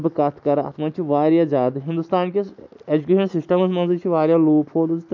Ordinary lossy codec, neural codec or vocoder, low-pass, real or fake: MP3, 64 kbps; none; 7.2 kHz; real